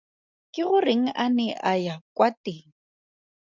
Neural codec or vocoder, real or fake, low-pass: none; real; 7.2 kHz